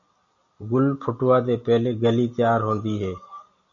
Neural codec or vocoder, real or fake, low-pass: none; real; 7.2 kHz